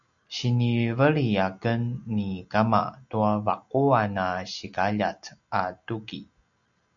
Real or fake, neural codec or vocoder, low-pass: real; none; 7.2 kHz